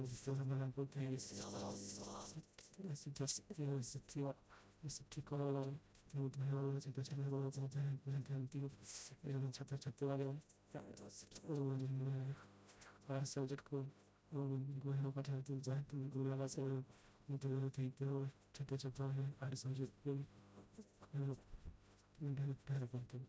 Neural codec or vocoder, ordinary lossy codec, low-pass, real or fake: codec, 16 kHz, 0.5 kbps, FreqCodec, smaller model; none; none; fake